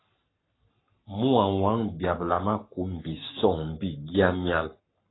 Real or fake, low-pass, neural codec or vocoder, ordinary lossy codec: fake; 7.2 kHz; codec, 44.1 kHz, 7.8 kbps, Pupu-Codec; AAC, 16 kbps